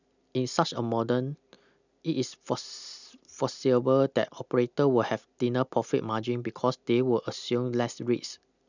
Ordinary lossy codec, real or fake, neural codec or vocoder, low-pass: none; real; none; 7.2 kHz